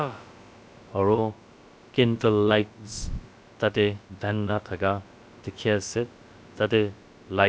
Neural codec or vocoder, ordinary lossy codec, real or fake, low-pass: codec, 16 kHz, about 1 kbps, DyCAST, with the encoder's durations; none; fake; none